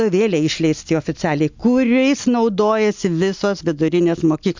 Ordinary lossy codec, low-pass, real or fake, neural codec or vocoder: MP3, 64 kbps; 7.2 kHz; real; none